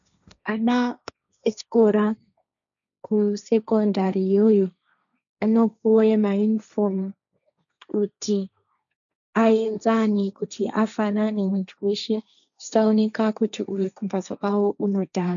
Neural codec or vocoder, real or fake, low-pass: codec, 16 kHz, 1.1 kbps, Voila-Tokenizer; fake; 7.2 kHz